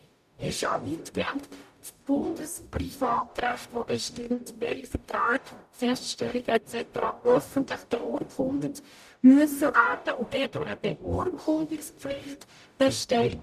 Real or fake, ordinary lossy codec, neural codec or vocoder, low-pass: fake; none; codec, 44.1 kHz, 0.9 kbps, DAC; 14.4 kHz